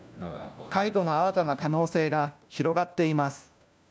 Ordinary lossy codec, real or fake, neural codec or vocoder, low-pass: none; fake; codec, 16 kHz, 1 kbps, FunCodec, trained on LibriTTS, 50 frames a second; none